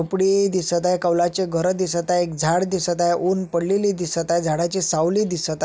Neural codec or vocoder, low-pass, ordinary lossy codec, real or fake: none; none; none; real